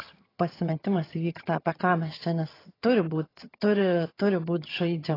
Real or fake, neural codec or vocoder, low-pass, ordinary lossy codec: fake; vocoder, 22.05 kHz, 80 mel bands, HiFi-GAN; 5.4 kHz; AAC, 24 kbps